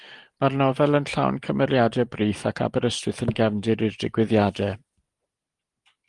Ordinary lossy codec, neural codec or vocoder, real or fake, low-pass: Opus, 24 kbps; none; real; 10.8 kHz